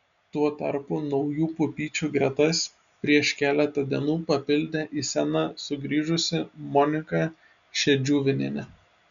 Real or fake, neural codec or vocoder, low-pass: real; none; 7.2 kHz